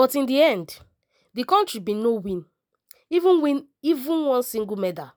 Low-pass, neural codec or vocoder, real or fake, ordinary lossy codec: none; none; real; none